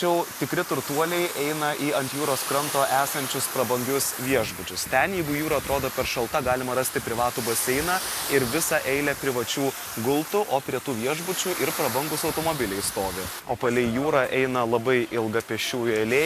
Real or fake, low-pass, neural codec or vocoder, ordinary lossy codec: real; 14.4 kHz; none; AAC, 64 kbps